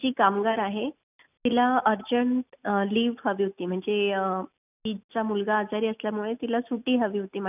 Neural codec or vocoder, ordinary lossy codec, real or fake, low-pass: none; none; real; 3.6 kHz